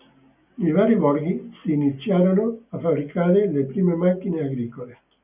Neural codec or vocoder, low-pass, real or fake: none; 3.6 kHz; real